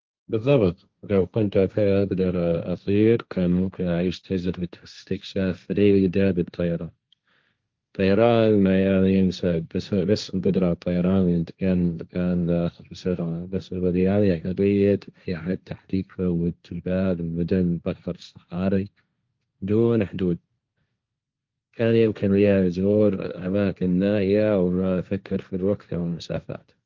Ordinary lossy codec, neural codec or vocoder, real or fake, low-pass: Opus, 32 kbps; codec, 16 kHz, 1.1 kbps, Voila-Tokenizer; fake; 7.2 kHz